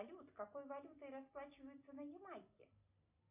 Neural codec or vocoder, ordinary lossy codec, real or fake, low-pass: none; MP3, 32 kbps; real; 3.6 kHz